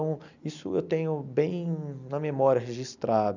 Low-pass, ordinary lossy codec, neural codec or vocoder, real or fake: 7.2 kHz; none; none; real